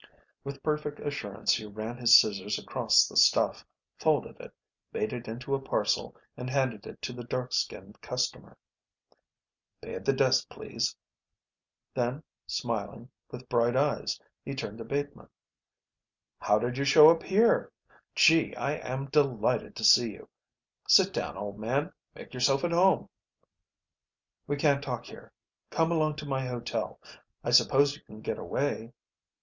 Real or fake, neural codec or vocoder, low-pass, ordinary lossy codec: real; none; 7.2 kHz; Opus, 64 kbps